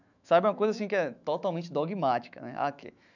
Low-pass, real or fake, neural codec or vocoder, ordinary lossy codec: 7.2 kHz; fake; autoencoder, 48 kHz, 128 numbers a frame, DAC-VAE, trained on Japanese speech; none